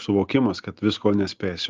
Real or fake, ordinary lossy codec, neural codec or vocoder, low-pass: real; Opus, 24 kbps; none; 7.2 kHz